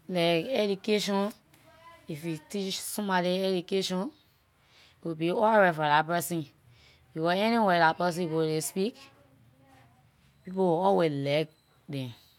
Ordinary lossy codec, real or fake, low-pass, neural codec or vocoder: none; real; 19.8 kHz; none